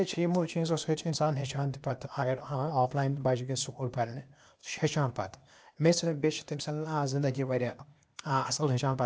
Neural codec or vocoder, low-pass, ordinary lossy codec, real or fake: codec, 16 kHz, 0.8 kbps, ZipCodec; none; none; fake